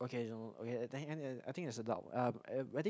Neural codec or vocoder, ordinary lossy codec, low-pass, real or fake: none; none; none; real